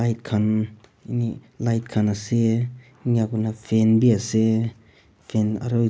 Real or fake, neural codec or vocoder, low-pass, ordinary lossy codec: real; none; none; none